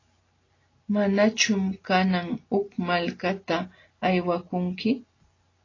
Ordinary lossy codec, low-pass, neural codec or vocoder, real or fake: AAC, 32 kbps; 7.2 kHz; none; real